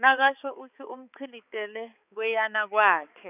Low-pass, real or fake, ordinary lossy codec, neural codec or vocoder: 3.6 kHz; fake; none; codec, 16 kHz, 4 kbps, X-Codec, HuBERT features, trained on balanced general audio